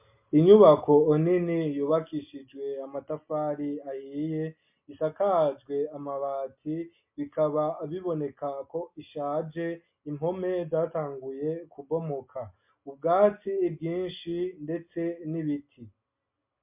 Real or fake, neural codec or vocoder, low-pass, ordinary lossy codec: real; none; 3.6 kHz; MP3, 32 kbps